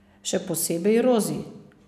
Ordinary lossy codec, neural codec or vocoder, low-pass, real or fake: none; none; 14.4 kHz; real